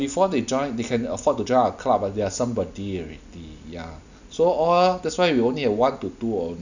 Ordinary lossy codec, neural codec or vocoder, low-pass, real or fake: none; none; 7.2 kHz; real